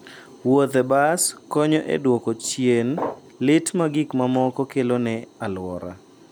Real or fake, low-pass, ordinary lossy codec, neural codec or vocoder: real; none; none; none